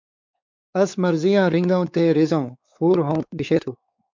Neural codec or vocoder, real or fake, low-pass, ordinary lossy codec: codec, 16 kHz, 4 kbps, X-Codec, WavLM features, trained on Multilingual LibriSpeech; fake; 7.2 kHz; MP3, 64 kbps